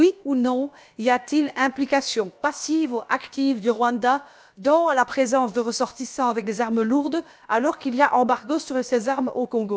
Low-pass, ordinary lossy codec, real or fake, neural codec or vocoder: none; none; fake; codec, 16 kHz, about 1 kbps, DyCAST, with the encoder's durations